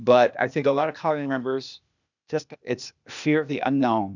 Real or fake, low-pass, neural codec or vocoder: fake; 7.2 kHz; codec, 16 kHz, 0.8 kbps, ZipCodec